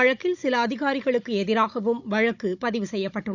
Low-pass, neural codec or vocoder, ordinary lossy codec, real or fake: 7.2 kHz; codec, 16 kHz, 16 kbps, FunCodec, trained on Chinese and English, 50 frames a second; none; fake